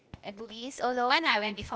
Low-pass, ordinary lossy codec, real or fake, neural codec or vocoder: none; none; fake; codec, 16 kHz, 0.8 kbps, ZipCodec